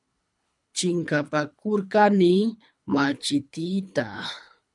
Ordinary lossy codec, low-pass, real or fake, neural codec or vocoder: MP3, 96 kbps; 10.8 kHz; fake; codec, 24 kHz, 3 kbps, HILCodec